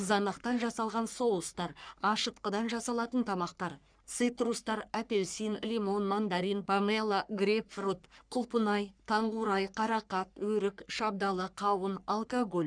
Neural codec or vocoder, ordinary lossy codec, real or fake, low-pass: codec, 44.1 kHz, 3.4 kbps, Pupu-Codec; none; fake; 9.9 kHz